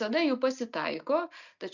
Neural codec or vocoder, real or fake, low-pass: none; real; 7.2 kHz